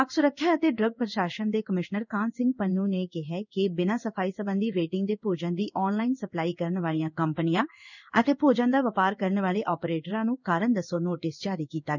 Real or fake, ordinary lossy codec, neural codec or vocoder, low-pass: fake; none; codec, 16 kHz in and 24 kHz out, 1 kbps, XY-Tokenizer; 7.2 kHz